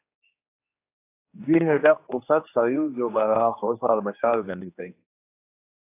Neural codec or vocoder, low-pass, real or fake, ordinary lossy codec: codec, 16 kHz, 2 kbps, X-Codec, HuBERT features, trained on general audio; 3.6 kHz; fake; AAC, 24 kbps